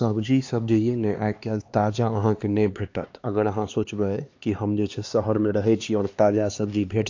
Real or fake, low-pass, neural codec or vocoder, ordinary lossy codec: fake; 7.2 kHz; codec, 16 kHz, 2 kbps, X-Codec, HuBERT features, trained on LibriSpeech; AAC, 48 kbps